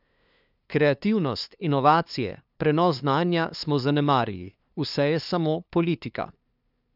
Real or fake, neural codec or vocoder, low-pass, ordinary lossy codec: fake; codec, 16 kHz, 2 kbps, FunCodec, trained on LibriTTS, 25 frames a second; 5.4 kHz; none